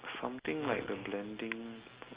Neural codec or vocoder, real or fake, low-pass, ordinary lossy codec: none; real; 3.6 kHz; Opus, 32 kbps